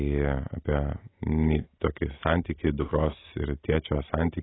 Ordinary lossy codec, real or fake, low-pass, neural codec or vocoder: AAC, 16 kbps; real; 7.2 kHz; none